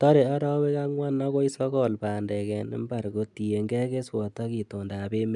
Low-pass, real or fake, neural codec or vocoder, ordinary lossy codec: 10.8 kHz; real; none; none